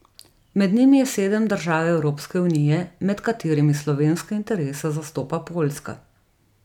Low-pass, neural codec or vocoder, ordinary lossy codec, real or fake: 19.8 kHz; vocoder, 44.1 kHz, 128 mel bands every 256 samples, BigVGAN v2; none; fake